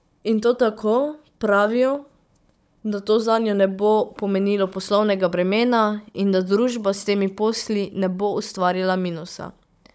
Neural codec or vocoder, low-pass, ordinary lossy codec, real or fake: codec, 16 kHz, 16 kbps, FunCodec, trained on Chinese and English, 50 frames a second; none; none; fake